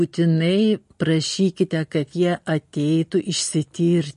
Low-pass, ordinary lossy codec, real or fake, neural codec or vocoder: 14.4 kHz; MP3, 48 kbps; real; none